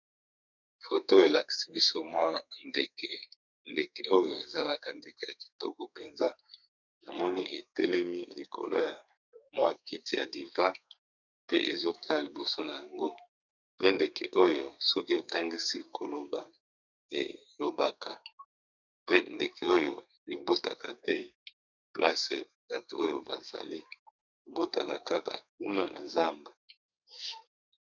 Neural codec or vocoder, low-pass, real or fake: codec, 32 kHz, 1.9 kbps, SNAC; 7.2 kHz; fake